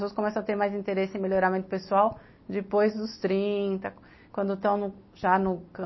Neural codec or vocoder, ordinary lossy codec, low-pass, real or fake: none; MP3, 24 kbps; 7.2 kHz; real